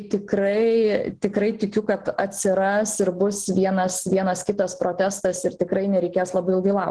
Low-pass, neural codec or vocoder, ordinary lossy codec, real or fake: 9.9 kHz; none; Opus, 16 kbps; real